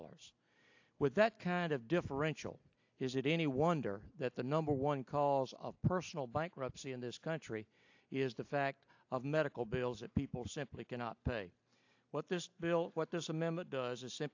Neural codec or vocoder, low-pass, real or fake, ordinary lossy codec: none; 7.2 kHz; real; AAC, 48 kbps